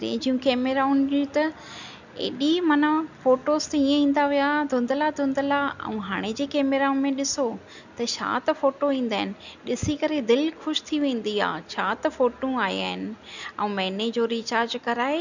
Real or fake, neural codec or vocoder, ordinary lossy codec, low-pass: real; none; none; 7.2 kHz